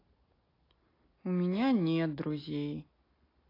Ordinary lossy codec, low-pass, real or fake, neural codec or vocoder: AAC, 24 kbps; 5.4 kHz; real; none